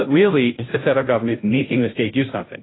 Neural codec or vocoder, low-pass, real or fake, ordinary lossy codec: codec, 16 kHz, 0.5 kbps, FunCodec, trained on Chinese and English, 25 frames a second; 7.2 kHz; fake; AAC, 16 kbps